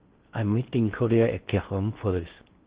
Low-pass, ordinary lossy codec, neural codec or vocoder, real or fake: 3.6 kHz; Opus, 16 kbps; codec, 16 kHz in and 24 kHz out, 0.6 kbps, FocalCodec, streaming, 4096 codes; fake